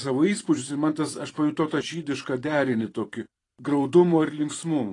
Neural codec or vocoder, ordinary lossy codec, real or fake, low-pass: vocoder, 44.1 kHz, 128 mel bands every 512 samples, BigVGAN v2; AAC, 32 kbps; fake; 10.8 kHz